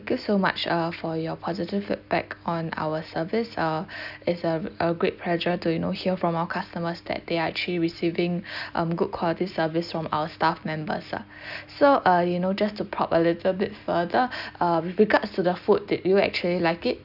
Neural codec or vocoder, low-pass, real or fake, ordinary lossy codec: none; 5.4 kHz; real; none